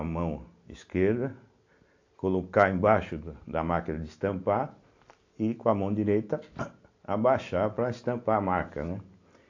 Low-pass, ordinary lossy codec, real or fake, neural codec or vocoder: 7.2 kHz; AAC, 48 kbps; real; none